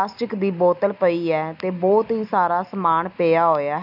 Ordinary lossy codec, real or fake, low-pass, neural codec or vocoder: none; real; 5.4 kHz; none